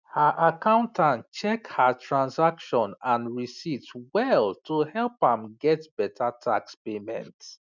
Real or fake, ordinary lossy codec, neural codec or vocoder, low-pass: real; none; none; 7.2 kHz